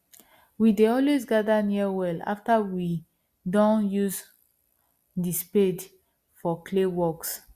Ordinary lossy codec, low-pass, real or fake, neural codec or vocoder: Opus, 64 kbps; 14.4 kHz; real; none